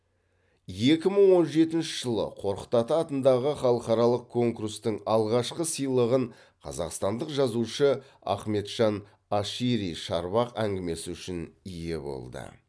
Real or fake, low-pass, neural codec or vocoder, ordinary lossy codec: real; none; none; none